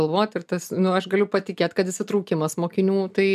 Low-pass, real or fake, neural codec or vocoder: 14.4 kHz; real; none